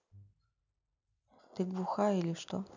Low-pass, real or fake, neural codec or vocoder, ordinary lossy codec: 7.2 kHz; real; none; none